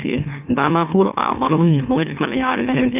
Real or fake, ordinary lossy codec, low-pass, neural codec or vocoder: fake; none; 3.6 kHz; autoencoder, 44.1 kHz, a latent of 192 numbers a frame, MeloTTS